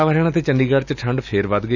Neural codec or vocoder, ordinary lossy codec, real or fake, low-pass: none; none; real; 7.2 kHz